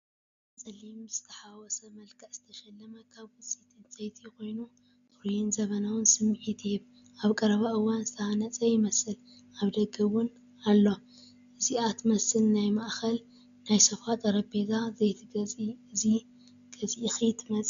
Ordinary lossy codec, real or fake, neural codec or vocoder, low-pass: AAC, 96 kbps; real; none; 7.2 kHz